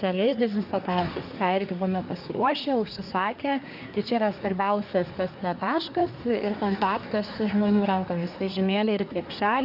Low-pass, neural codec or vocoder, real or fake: 5.4 kHz; codec, 24 kHz, 1 kbps, SNAC; fake